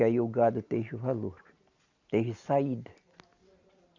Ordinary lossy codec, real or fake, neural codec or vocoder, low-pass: Opus, 64 kbps; real; none; 7.2 kHz